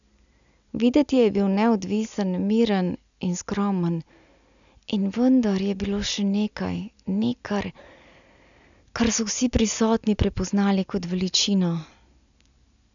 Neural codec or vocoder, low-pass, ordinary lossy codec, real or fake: none; 7.2 kHz; none; real